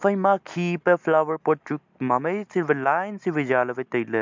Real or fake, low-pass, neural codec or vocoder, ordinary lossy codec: real; 7.2 kHz; none; MP3, 64 kbps